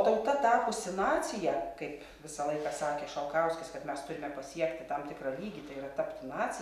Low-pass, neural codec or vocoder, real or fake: 14.4 kHz; none; real